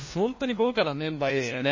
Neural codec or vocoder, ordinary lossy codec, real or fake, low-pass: codec, 16 kHz, 1 kbps, FunCodec, trained on LibriTTS, 50 frames a second; MP3, 32 kbps; fake; 7.2 kHz